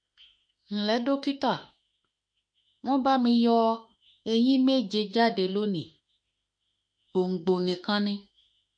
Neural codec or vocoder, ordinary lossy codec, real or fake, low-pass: autoencoder, 48 kHz, 32 numbers a frame, DAC-VAE, trained on Japanese speech; MP3, 48 kbps; fake; 9.9 kHz